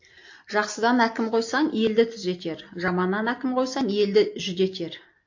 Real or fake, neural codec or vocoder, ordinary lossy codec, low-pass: real; none; AAC, 48 kbps; 7.2 kHz